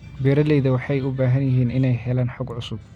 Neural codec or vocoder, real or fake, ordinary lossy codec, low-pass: none; real; none; 19.8 kHz